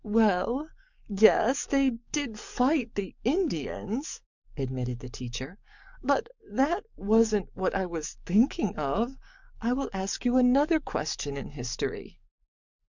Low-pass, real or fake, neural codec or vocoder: 7.2 kHz; fake; codec, 44.1 kHz, 7.8 kbps, DAC